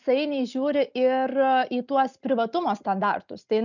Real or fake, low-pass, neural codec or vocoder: real; 7.2 kHz; none